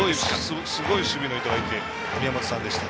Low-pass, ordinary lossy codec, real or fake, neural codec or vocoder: none; none; real; none